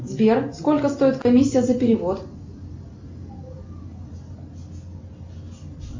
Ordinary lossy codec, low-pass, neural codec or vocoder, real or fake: MP3, 48 kbps; 7.2 kHz; none; real